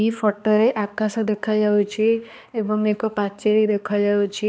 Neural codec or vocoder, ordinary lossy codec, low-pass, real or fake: codec, 16 kHz, 2 kbps, X-Codec, HuBERT features, trained on balanced general audio; none; none; fake